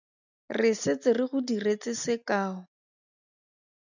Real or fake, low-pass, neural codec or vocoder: real; 7.2 kHz; none